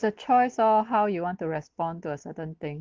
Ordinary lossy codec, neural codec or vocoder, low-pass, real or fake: Opus, 16 kbps; none; 7.2 kHz; real